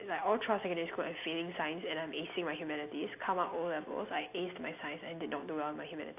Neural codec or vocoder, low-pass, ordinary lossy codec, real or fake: none; 3.6 kHz; AAC, 32 kbps; real